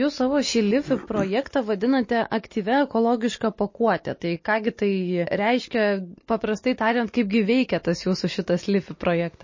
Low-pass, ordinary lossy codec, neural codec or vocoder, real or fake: 7.2 kHz; MP3, 32 kbps; none; real